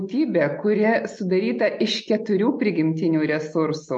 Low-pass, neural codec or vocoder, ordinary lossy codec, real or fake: 9.9 kHz; none; MP3, 48 kbps; real